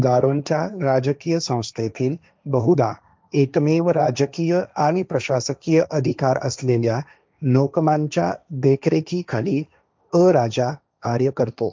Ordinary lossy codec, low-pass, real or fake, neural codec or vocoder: none; none; fake; codec, 16 kHz, 1.1 kbps, Voila-Tokenizer